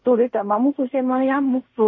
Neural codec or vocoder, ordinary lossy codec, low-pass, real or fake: codec, 16 kHz in and 24 kHz out, 0.4 kbps, LongCat-Audio-Codec, fine tuned four codebook decoder; MP3, 32 kbps; 7.2 kHz; fake